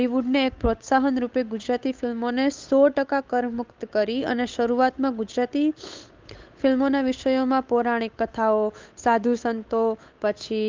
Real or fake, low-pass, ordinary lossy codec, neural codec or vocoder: fake; 7.2 kHz; Opus, 24 kbps; codec, 16 kHz, 8 kbps, FunCodec, trained on Chinese and English, 25 frames a second